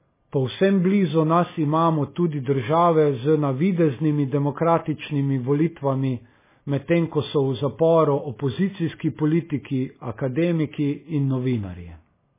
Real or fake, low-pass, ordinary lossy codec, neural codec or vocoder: real; 3.6 kHz; MP3, 16 kbps; none